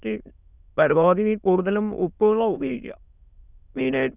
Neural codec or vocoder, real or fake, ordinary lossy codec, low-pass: autoencoder, 22.05 kHz, a latent of 192 numbers a frame, VITS, trained on many speakers; fake; none; 3.6 kHz